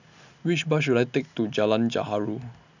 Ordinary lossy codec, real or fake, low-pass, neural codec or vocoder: none; real; 7.2 kHz; none